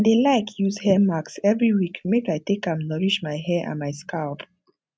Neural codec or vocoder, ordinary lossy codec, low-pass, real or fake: none; none; none; real